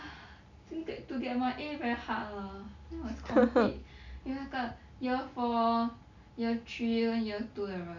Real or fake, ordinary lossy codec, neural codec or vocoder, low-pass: real; none; none; 7.2 kHz